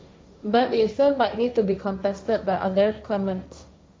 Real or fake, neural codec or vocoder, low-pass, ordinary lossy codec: fake; codec, 16 kHz, 1.1 kbps, Voila-Tokenizer; none; none